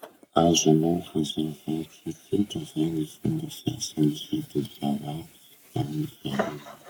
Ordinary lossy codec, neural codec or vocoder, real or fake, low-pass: none; codec, 44.1 kHz, 7.8 kbps, Pupu-Codec; fake; none